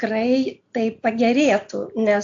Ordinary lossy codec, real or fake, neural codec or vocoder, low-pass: AAC, 64 kbps; real; none; 7.2 kHz